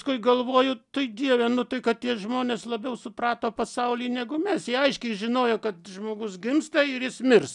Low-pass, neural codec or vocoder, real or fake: 10.8 kHz; none; real